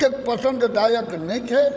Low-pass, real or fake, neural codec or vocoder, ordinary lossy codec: none; fake; codec, 16 kHz, 16 kbps, FreqCodec, larger model; none